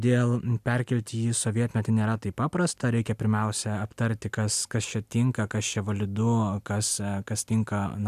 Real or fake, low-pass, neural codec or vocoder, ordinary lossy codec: real; 14.4 kHz; none; AAC, 96 kbps